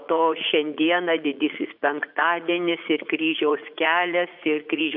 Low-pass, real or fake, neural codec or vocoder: 5.4 kHz; fake; vocoder, 44.1 kHz, 80 mel bands, Vocos